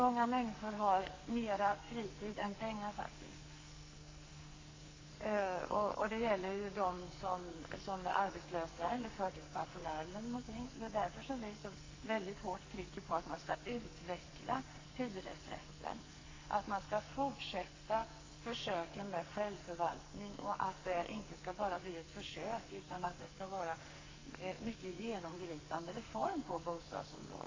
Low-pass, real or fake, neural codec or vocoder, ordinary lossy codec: 7.2 kHz; fake; codec, 44.1 kHz, 2.6 kbps, SNAC; AAC, 32 kbps